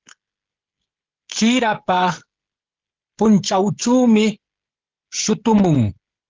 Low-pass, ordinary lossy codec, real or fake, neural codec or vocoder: 7.2 kHz; Opus, 16 kbps; fake; codec, 16 kHz, 16 kbps, FreqCodec, smaller model